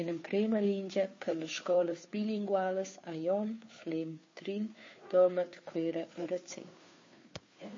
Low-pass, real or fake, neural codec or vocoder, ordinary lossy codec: 7.2 kHz; fake; codec, 16 kHz, 2 kbps, FunCodec, trained on Chinese and English, 25 frames a second; MP3, 32 kbps